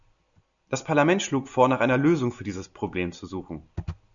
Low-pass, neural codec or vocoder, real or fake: 7.2 kHz; none; real